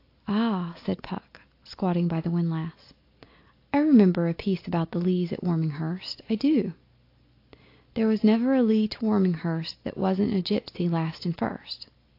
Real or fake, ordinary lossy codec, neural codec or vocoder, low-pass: real; AAC, 32 kbps; none; 5.4 kHz